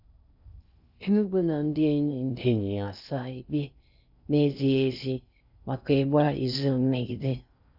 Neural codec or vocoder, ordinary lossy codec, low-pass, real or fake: codec, 16 kHz in and 24 kHz out, 0.6 kbps, FocalCodec, streaming, 2048 codes; none; 5.4 kHz; fake